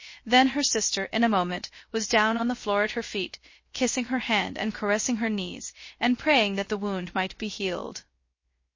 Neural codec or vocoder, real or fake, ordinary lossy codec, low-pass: codec, 16 kHz, 0.3 kbps, FocalCodec; fake; MP3, 32 kbps; 7.2 kHz